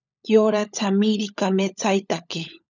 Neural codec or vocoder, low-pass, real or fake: codec, 16 kHz, 16 kbps, FunCodec, trained on LibriTTS, 50 frames a second; 7.2 kHz; fake